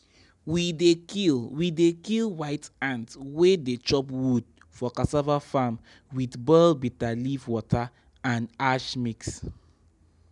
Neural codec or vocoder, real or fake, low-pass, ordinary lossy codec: none; real; 10.8 kHz; none